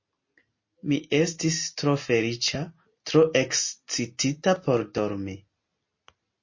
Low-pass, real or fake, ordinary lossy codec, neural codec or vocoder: 7.2 kHz; real; MP3, 32 kbps; none